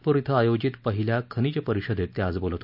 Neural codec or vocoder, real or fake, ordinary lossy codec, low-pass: none; real; none; 5.4 kHz